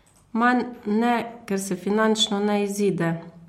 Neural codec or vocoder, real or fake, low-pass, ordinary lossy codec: none; real; 19.8 kHz; MP3, 64 kbps